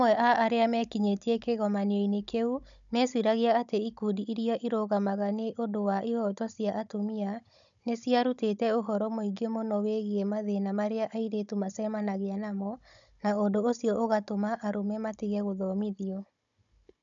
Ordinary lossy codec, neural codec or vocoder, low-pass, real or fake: none; codec, 16 kHz, 16 kbps, FunCodec, trained on Chinese and English, 50 frames a second; 7.2 kHz; fake